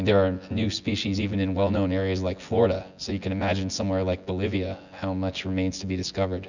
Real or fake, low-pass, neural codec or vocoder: fake; 7.2 kHz; vocoder, 24 kHz, 100 mel bands, Vocos